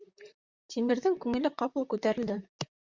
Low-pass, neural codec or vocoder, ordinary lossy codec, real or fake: 7.2 kHz; vocoder, 44.1 kHz, 128 mel bands, Pupu-Vocoder; Opus, 64 kbps; fake